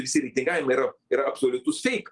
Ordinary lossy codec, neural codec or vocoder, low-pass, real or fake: Opus, 24 kbps; none; 10.8 kHz; real